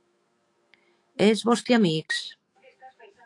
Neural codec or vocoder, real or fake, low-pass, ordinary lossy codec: autoencoder, 48 kHz, 128 numbers a frame, DAC-VAE, trained on Japanese speech; fake; 10.8 kHz; AAC, 48 kbps